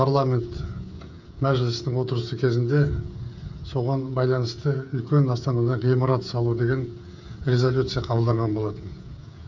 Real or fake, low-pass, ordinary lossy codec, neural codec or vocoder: fake; 7.2 kHz; none; codec, 16 kHz, 8 kbps, FreqCodec, smaller model